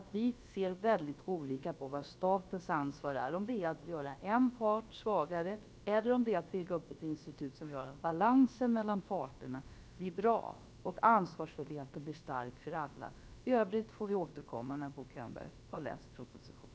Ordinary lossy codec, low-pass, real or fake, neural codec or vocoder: none; none; fake; codec, 16 kHz, about 1 kbps, DyCAST, with the encoder's durations